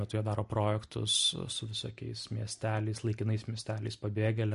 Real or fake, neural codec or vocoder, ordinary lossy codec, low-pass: real; none; MP3, 48 kbps; 10.8 kHz